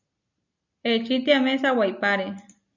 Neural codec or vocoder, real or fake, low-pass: none; real; 7.2 kHz